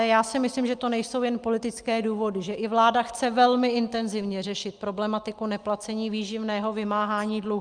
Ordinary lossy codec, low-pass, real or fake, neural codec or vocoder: Opus, 32 kbps; 9.9 kHz; real; none